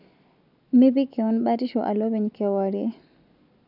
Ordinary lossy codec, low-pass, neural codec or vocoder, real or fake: none; 5.4 kHz; none; real